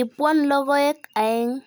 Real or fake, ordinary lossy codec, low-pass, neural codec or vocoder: real; none; none; none